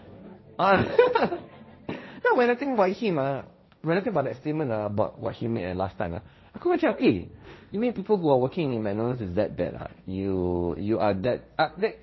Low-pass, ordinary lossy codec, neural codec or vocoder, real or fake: 7.2 kHz; MP3, 24 kbps; codec, 16 kHz, 1.1 kbps, Voila-Tokenizer; fake